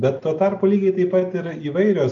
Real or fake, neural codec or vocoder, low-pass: real; none; 7.2 kHz